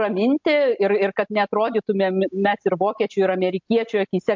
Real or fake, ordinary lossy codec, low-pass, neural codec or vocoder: real; MP3, 64 kbps; 7.2 kHz; none